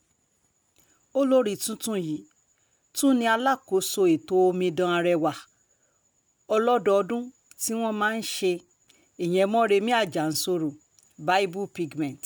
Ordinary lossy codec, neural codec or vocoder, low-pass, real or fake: none; none; none; real